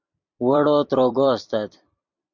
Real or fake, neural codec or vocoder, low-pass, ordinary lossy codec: fake; vocoder, 44.1 kHz, 128 mel bands every 256 samples, BigVGAN v2; 7.2 kHz; MP3, 64 kbps